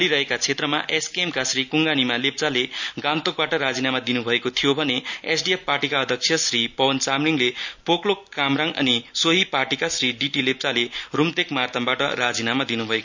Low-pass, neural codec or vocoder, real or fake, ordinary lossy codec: 7.2 kHz; none; real; none